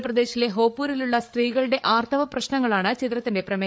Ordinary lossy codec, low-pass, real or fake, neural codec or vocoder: none; none; fake; codec, 16 kHz, 8 kbps, FreqCodec, larger model